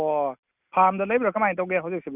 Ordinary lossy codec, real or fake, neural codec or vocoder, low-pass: Opus, 64 kbps; real; none; 3.6 kHz